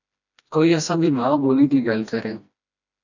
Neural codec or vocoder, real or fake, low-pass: codec, 16 kHz, 1 kbps, FreqCodec, smaller model; fake; 7.2 kHz